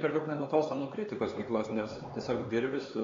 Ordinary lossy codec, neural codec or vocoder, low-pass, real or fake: AAC, 32 kbps; codec, 16 kHz, 4 kbps, X-Codec, HuBERT features, trained on LibriSpeech; 7.2 kHz; fake